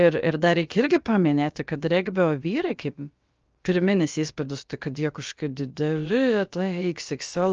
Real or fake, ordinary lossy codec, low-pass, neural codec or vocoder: fake; Opus, 24 kbps; 7.2 kHz; codec, 16 kHz, about 1 kbps, DyCAST, with the encoder's durations